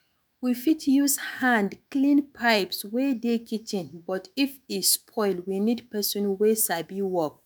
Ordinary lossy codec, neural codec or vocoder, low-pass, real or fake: none; autoencoder, 48 kHz, 128 numbers a frame, DAC-VAE, trained on Japanese speech; none; fake